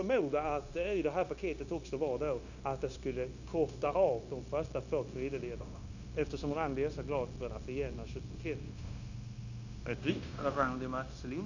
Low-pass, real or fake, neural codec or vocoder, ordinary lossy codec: 7.2 kHz; fake; codec, 16 kHz, 0.9 kbps, LongCat-Audio-Codec; none